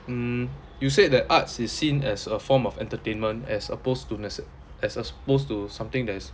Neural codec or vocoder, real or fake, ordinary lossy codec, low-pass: none; real; none; none